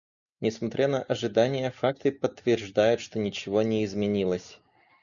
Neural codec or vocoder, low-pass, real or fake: none; 7.2 kHz; real